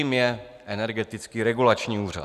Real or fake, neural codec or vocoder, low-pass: real; none; 14.4 kHz